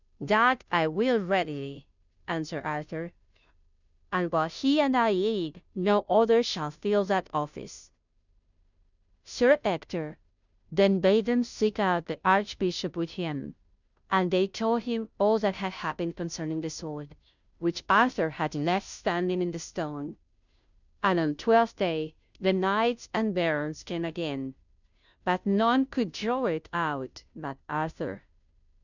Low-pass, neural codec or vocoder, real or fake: 7.2 kHz; codec, 16 kHz, 0.5 kbps, FunCodec, trained on Chinese and English, 25 frames a second; fake